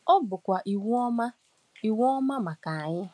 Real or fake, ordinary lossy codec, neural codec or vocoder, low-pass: real; none; none; none